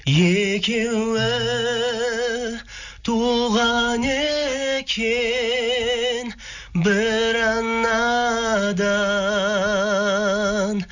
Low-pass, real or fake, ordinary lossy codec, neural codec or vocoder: 7.2 kHz; real; none; none